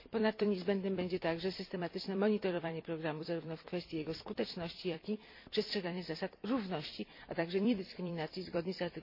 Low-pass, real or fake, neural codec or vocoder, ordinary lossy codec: 5.4 kHz; fake; vocoder, 44.1 kHz, 128 mel bands every 256 samples, BigVGAN v2; MP3, 32 kbps